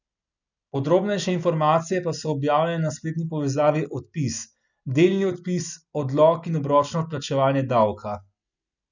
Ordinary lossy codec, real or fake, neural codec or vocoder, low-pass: none; real; none; 7.2 kHz